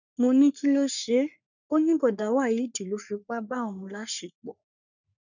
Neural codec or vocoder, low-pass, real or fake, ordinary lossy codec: codec, 44.1 kHz, 3.4 kbps, Pupu-Codec; 7.2 kHz; fake; none